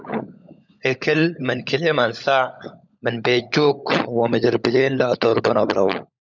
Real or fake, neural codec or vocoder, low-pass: fake; codec, 16 kHz, 16 kbps, FunCodec, trained on LibriTTS, 50 frames a second; 7.2 kHz